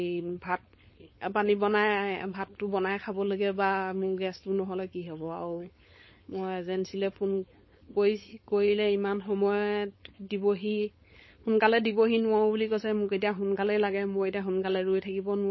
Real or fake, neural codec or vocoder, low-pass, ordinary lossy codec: fake; codec, 16 kHz, 4.8 kbps, FACodec; 7.2 kHz; MP3, 24 kbps